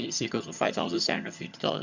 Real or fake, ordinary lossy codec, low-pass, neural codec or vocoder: fake; none; 7.2 kHz; vocoder, 22.05 kHz, 80 mel bands, HiFi-GAN